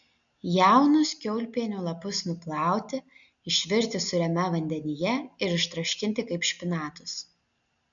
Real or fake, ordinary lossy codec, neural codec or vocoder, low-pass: real; MP3, 96 kbps; none; 7.2 kHz